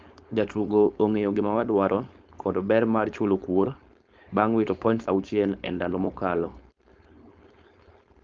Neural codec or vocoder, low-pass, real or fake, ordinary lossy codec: codec, 16 kHz, 4.8 kbps, FACodec; 7.2 kHz; fake; Opus, 24 kbps